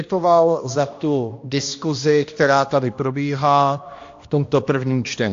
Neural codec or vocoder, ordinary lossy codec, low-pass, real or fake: codec, 16 kHz, 1 kbps, X-Codec, HuBERT features, trained on balanced general audio; AAC, 48 kbps; 7.2 kHz; fake